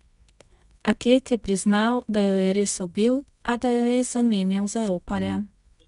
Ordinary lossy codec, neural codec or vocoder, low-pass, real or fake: none; codec, 24 kHz, 0.9 kbps, WavTokenizer, medium music audio release; 10.8 kHz; fake